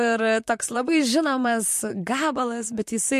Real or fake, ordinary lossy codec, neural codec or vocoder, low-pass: fake; MP3, 64 kbps; vocoder, 44.1 kHz, 128 mel bands, Pupu-Vocoder; 14.4 kHz